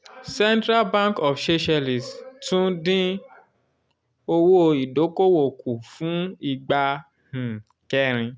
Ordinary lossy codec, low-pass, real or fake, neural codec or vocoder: none; none; real; none